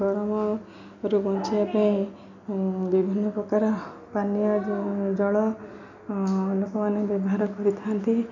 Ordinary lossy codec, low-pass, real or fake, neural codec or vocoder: none; 7.2 kHz; real; none